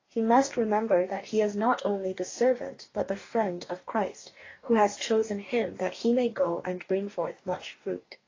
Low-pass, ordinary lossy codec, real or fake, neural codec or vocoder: 7.2 kHz; AAC, 32 kbps; fake; codec, 44.1 kHz, 2.6 kbps, DAC